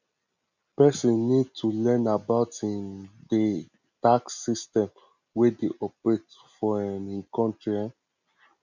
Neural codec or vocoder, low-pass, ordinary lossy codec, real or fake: none; 7.2 kHz; none; real